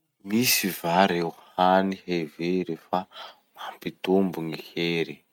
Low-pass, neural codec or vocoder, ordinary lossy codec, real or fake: 19.8 kHz; none; none; real